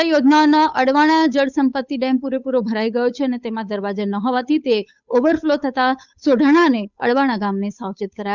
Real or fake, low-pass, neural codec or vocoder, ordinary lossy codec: fake; 7.2 kHz; codec, 16 kHz, 8 kbps, FunCodec, trained on Chinese and English, 25 frames a second; none